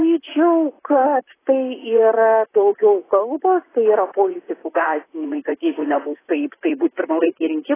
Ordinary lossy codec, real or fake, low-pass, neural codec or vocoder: AAC, 16 kbps; fake; 3.6 kHz; vocoder, 44.1 kHz, 128 mel bands, Pupu-Vocoder